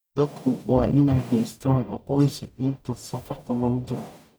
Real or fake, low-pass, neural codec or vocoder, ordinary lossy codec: fake; none; codec, 44.1 kHz, 0.9 kbps, DAC; none